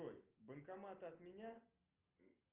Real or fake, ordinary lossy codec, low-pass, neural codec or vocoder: real; Opus, 32 kbps; 3.6 kHz; none